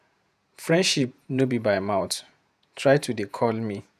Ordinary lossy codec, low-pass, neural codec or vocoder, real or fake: none; 14.4 kHz; vocoder, 48 kHz, 128 mel bands, Vocos; fake